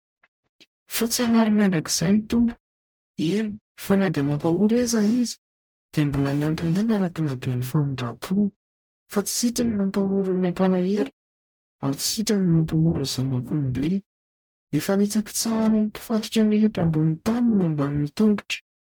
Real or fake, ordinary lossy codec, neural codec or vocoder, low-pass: fake; MP3, 96 kbps; codec, 44.1 kHz, 0.9 kbps, DAC; 19.8 kHz